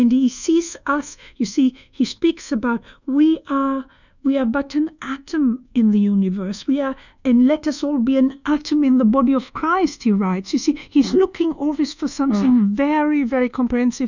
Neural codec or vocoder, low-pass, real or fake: codec, 24 kHz, 1.2 kbps, DualCodec; 7.2 kHz; fake